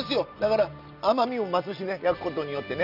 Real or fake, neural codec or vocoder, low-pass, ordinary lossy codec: real; none; 5.4 kHz; none